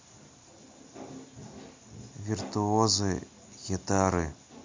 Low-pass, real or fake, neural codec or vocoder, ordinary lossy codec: 7.2 kHz; real; none; MP3, 48 kbps